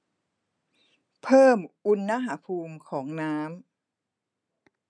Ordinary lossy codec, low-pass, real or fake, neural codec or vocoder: none; 9.9 kHz; real; none